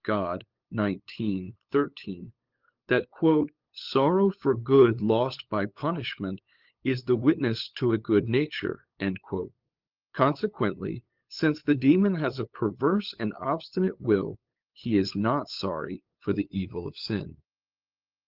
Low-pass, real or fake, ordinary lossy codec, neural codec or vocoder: 5.4 kHz; fake; Opus, 24 kbps; codec, 16 kHz, 8 kbps, FunCodec, trained on LibriTTS, 25 frames a second